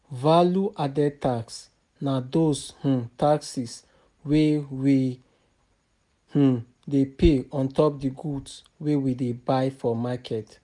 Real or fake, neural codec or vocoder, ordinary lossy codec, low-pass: real; none; none; 10.8 kHz